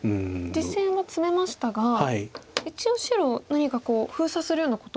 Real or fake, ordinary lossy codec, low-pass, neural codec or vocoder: real; none; none; none